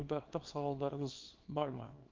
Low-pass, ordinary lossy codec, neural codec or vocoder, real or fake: 7.2 kHz; Opus, 32 kbps; codec, 24 kHz, 0.9 kbps, WavTokenizer, small release; fake